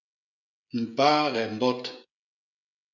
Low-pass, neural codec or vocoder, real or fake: 7.2 kHz; codec, 16 kHz, 16 kbps, FreqCodec, smaller model; fake